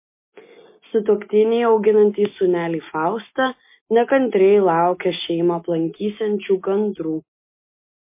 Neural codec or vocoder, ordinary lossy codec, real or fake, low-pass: none; MP3, 24 kbps; real; 3.6 kHz